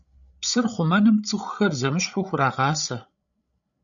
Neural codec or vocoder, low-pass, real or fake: codec, 16 kHz, 16 kbps, FreqCodec, larger model; 7.2 kHz; fake